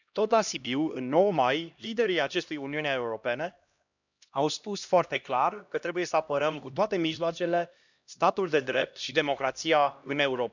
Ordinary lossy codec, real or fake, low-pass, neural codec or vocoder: none; fake; 7.2 kHz; codec, 16 kHz, 1 kbps, X-Codec, HuBERT features, trained on LibriSpeech